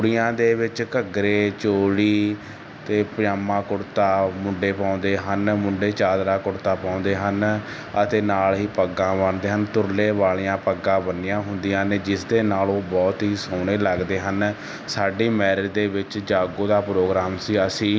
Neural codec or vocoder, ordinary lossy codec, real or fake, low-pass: none; none; real; none